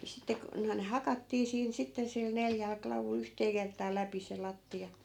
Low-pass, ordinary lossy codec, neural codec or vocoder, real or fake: 19.8 kHz; none; none; real